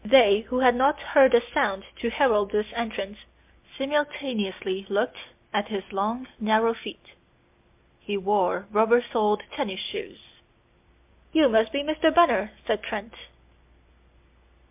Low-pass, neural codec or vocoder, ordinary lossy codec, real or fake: 3.6 kHz; none; MP3, 32 kbps; real